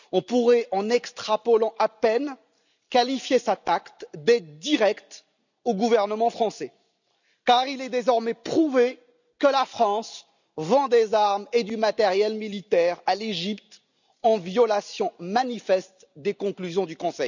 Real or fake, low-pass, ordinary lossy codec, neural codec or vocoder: real; 7.2 kHz; none; none